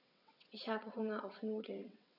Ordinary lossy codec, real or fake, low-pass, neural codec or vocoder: none; fake; 5.4 kHz; vocoder, 22.05 kHz, 80 mel bands, WaveNeXt